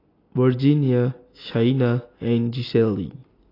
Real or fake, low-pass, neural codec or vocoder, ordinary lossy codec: real; 5.4 kHz; none; AAC, 24 kbps